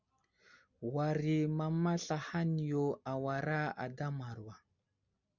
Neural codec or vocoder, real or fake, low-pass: none; real; 7.2 kHz